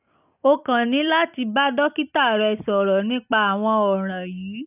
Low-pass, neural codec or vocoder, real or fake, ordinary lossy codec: 3.6 kHz; none; real; none